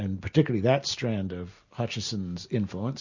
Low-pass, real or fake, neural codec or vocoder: 7.2 kHz; real; none